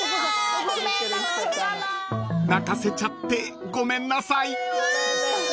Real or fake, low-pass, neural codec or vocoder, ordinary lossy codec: real; none; none; none